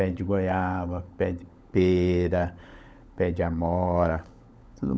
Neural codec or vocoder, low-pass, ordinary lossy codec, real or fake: codec, 16 kHz, 16 kbps, FunCodec, trained on LibriTTS, 50 frames a second; none; none; fake